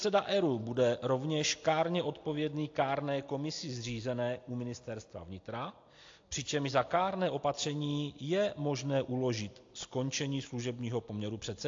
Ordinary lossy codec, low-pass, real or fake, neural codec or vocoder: AAC, 48 kbps; 7.2 kHz; real; none